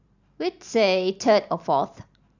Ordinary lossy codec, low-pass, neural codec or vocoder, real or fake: none; 7.2 kHz; vocoder, 44.1 kHz, 128 mel bands every 512 samples, BigVGAN v2; fake